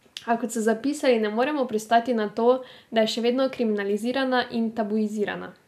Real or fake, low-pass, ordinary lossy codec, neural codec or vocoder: real; 14.4 kHz; none; none